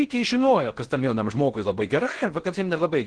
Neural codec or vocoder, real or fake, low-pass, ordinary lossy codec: codec, 16 kHz in and 24 kHz out, 0.6 kbps, FocalCodec, streaming, 4096 codes; fake; 9.9 kHz; Opus, 16 kbps